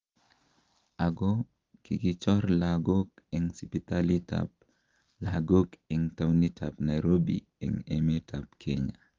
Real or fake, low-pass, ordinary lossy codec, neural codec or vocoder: real; 7.2 kHz; Opus, 32 kbps; none